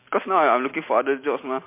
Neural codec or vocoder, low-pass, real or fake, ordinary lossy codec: none; 3.6 kHz; real; MP3, 24 kbps